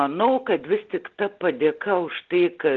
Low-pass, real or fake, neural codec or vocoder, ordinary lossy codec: 7.2 kHz; real; none; Opus, 16 kbps